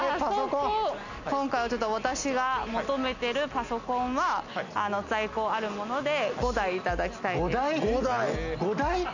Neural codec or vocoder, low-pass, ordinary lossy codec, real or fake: none; 7.2 kHz; none; real